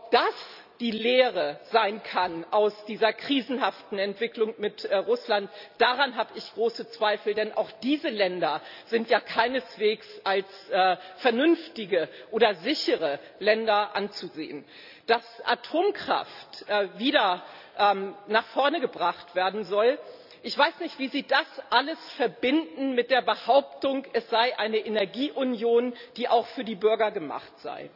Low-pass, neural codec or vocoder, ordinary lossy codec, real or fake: 5.4 kHz; none; none; real